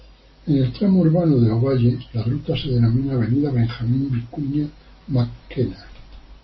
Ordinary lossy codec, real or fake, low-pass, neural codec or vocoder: MP3, 24 kbps; real; 7.2 kHz; none